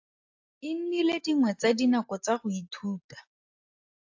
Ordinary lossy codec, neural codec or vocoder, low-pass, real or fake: AAC, 48 kbps; none; 7.2 kHz; real